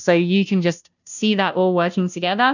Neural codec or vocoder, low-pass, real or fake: codec, 16 kHz, 0.5 kbps, FunCodec, trained on Chinese and English, 25 frames a second; 7.2 kHz; fake